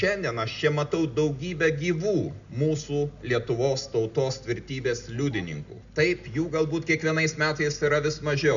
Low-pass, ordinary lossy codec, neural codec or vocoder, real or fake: 7.2 kHz; MP3, 96 kbps; none; real